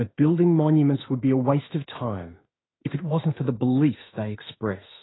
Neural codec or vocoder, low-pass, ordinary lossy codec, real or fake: autoencoder, 48 kHz, 32 numbers a frame, DAC-VAE, trained on Japanese speech; 7.2 kHz; AAC, 16 kbps; fake